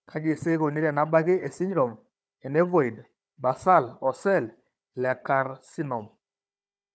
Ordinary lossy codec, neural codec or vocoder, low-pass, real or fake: none; codec, 16 kHz, 4 kbps, FunCodec, trained on Chinese and English, 50 frames a second; none; fake